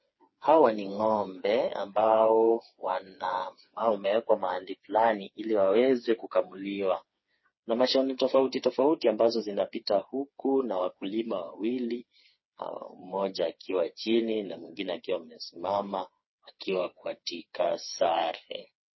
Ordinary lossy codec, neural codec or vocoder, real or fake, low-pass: MP3, 24 kbps; codec, 16 kHz, 4 kbps, FreqCodec, smaller model; fake; 7.2 kHz